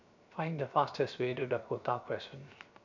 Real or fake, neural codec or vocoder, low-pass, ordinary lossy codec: fake; codec, 16 kHz, 0.7 kbps, FocalCodec; 7.2 kHz; none